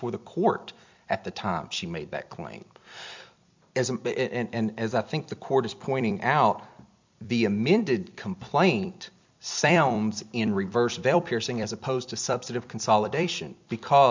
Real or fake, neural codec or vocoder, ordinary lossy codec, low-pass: fake; vocoder, 44.1 kHz, 128 mel bands every 256 samples, BigVGAN v2; MP3, 64 kbps; 7.2 kHz